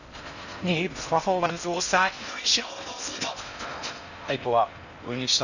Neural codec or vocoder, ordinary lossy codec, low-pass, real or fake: codec, 16 kHz in and 24 kHz out, 0.6 kbps, FocalCodec, streaming, 4096 codes; none; 7.2 kHz; fake